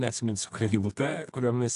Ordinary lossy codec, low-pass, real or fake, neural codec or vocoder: AAC, 64 kbps; 10.8 kHz; fake; codec, 24 kHz, 0.9 kbps, WavTokenizer, medium music audio release